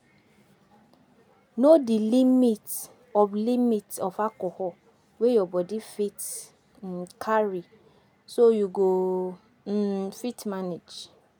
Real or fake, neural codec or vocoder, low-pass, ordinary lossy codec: real; none; none; none